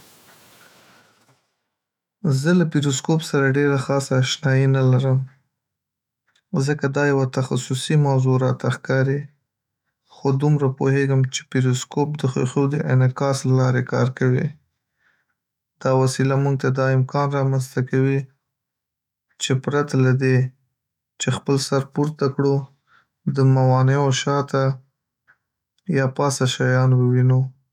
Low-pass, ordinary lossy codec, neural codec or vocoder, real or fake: 19.8 kHz; none; autoencoder, 48 kHz, 128 numbers a frame, DAC-VAE, trained on Japanese speech; fake